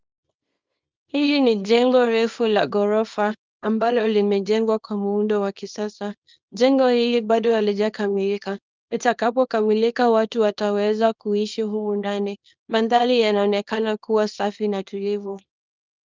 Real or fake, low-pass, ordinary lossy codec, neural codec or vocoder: fake; 7.2 kHz; Opus, 32 kbps; codec, 24 kHz, 0.9 kbps, WavTokenizer, small release